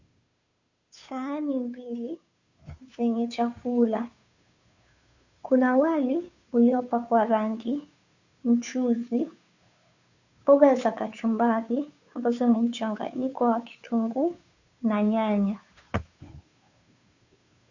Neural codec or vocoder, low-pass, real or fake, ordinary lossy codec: codec, 16 kHz, 2 kbps, FunCodec, trained on Chinese and English, 25 frames a second; 7.2 kHz; fake; Opus, 64 kbps